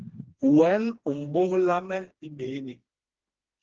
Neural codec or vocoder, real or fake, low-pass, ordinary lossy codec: codec, 16 kHz, 2 kbps, FreqCodec, smaller model; fake; 7.2 kHz; Opus, 16 kbps